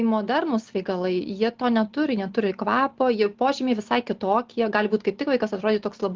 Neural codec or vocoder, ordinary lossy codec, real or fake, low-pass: none; Opus, 16 kbps; real; 7.2 kHz